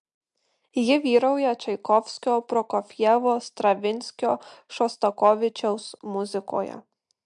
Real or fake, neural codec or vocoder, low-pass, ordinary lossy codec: real; none; 10.8 kHz; MP3, 64 kbps